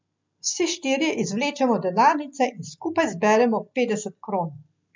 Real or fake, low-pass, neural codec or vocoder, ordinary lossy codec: real; 7.2 kHz; none; MP3, 64 kbps